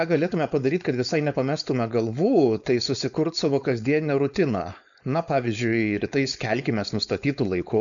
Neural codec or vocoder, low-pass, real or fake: codec, 16 kHz, 4.8 kbps, FACodec; 7.2 kHz; fake